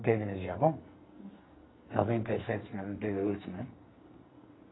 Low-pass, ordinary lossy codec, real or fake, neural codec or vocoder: 7.2 kHz; AAC, 16 kbps; fake; codec, 44.1 kHz, 2.6 kbps, SNAC